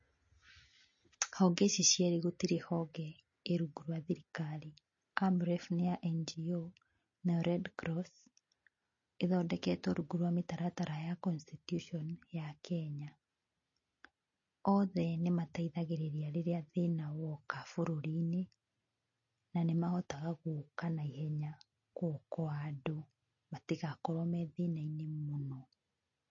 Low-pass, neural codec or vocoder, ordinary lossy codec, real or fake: 7.2 kHz; none; MP3, 32 kbps; real